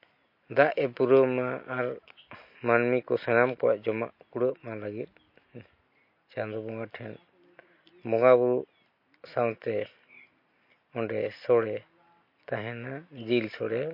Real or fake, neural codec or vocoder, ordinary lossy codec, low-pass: real; none; MP3, 32 kbps; 5.4 kHz